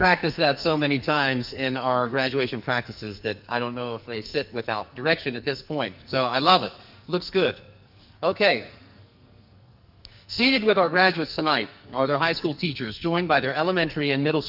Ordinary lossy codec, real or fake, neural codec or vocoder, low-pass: Opus, 64 kbps; fake; codec, 44.1 kHz, 2.6 kbps, SNAC; 5.4 kHz